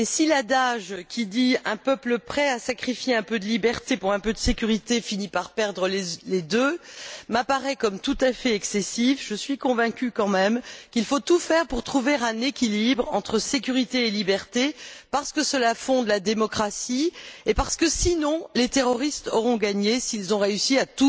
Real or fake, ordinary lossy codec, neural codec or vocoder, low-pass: real; none; none; none